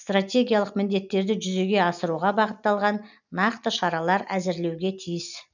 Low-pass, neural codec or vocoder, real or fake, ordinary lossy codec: 7.2 kHz; none; real; none